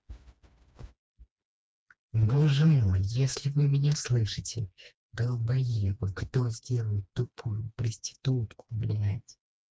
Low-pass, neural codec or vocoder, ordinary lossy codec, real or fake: none; codec, 16 kHz, 2 kbps, FreqCodec, smaller model; none; fake